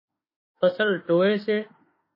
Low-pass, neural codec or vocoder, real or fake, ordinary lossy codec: 5.4 kHz; autoencoder, 48 kHz, 32 numbers a frame, DAC-VAE, trained on Japanese speech; fake; MP3, 24 kbps